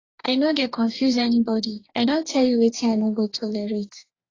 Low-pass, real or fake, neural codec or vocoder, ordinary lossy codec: 7.2 kHz; fake; codec, 44.1 kHz, 2.6 kbps, DAC; AAC, 32 kbps